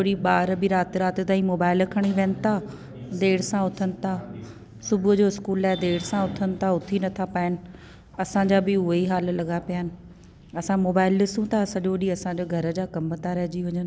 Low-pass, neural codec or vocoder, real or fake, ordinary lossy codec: none; none; real; none